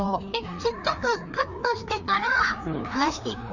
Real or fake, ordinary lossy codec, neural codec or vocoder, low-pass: fake; none; codec, 16 kHz, 2 kbps, FreqCodec, larger model; 7.2 kHz